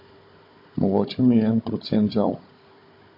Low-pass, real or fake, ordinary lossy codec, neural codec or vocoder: 5.4 kHz; fake; MP3, 32 kbps; codec, 16 kHz, 16 kbps, FunCodec, trained on Chinese and English, 50 frames a second